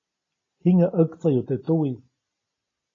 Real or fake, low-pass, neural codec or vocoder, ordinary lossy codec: real; 7.2 kHz; none; AAC, 32 kbps